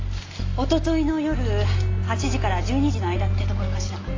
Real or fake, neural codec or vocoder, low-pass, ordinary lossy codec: real; none; 7.2 kHz; AAC, 32 kbps